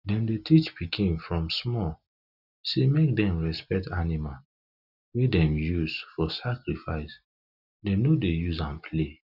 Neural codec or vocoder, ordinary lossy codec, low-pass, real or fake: none; none; 5.4 kHz; real